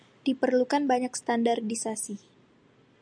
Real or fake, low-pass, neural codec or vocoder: real; 9.9 kHz; none